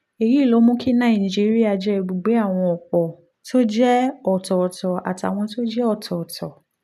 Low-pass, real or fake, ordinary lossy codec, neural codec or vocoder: 14.4 kHz; real; none; none